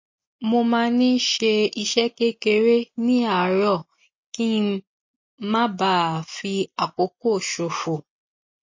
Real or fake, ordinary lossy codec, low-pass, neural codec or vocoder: real; MP3, 32 kbps; 7.2 kHz; none